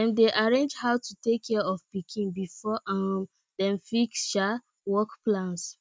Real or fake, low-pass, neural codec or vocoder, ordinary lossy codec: real; none; none; none